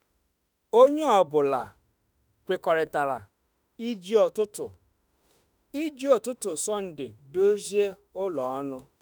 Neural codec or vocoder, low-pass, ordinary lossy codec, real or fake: autoencoder, 48 kHz, 32 numbers a frame, DAC-VAE, trained on Japanese speech; none; none; fake